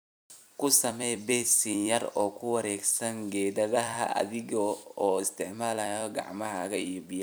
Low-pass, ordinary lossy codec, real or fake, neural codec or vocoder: none; none; fake; vocoder, 44.1 kHz, 128 mel bands every 256 samples, BigVGAN v2